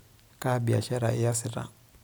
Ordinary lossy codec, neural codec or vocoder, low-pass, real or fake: none; none; none; real